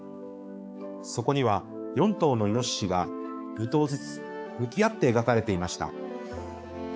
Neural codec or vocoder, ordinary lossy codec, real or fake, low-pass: codec, 16 kHz, 4 kbps, X-Codec, HuBERT features, trained on balanced general audio; none; fake; none